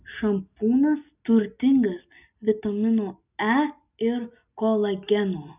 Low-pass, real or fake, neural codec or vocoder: 3.6 kHz; real; none